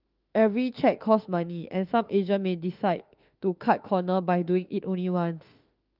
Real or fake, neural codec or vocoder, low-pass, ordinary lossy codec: fake; autoencoder, 48 kHz, 32 numbers a frame, DAC-VAE, trained on Japanese speech; 5.4 kHz; Opus, 32 kbps